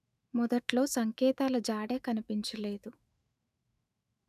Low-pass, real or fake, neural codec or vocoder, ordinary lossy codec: 14.4 kHz; fake; autoencoder, 48 kHz, 128 numbers a frame, DAC-VAE, trained on Japanese speech; none